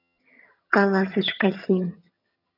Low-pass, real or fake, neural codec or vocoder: 5.4 kHz; fake; vocoder, 22.05 kHz, 80 mel bands, HiFi-GAN